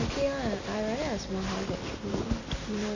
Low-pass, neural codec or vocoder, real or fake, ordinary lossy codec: 7.2 kHz; none; real; none